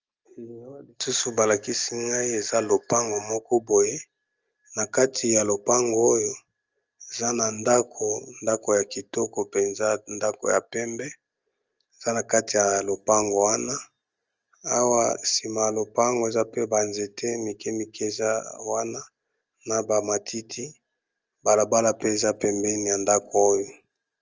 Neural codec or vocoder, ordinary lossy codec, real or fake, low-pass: none; Opus, 24 kbps; real; 7.2 kHz